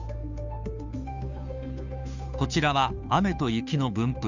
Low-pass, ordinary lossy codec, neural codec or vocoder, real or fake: 7.2 kHz; none; codec, 16 kHz, 2 kbps, FunCodec, trained on Chinese and English, 25 frames a second; fake